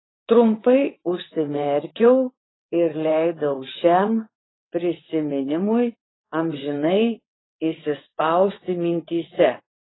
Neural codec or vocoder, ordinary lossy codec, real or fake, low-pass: vocoder, 22.05 kHz, 80 mel bands, WaveNeXt; AAC, 16 kbps; fake; 7.2 kHz